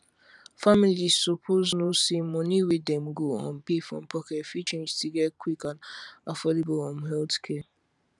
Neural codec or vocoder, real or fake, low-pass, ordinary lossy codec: vocoder, 44.1 kHz, 128 mel bands every 512 samples, BigVGAN v2; fake; 10.8 kHz; none